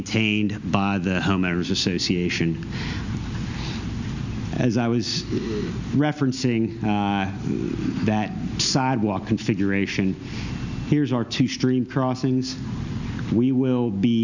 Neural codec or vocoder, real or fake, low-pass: none; real; 7.2 kHz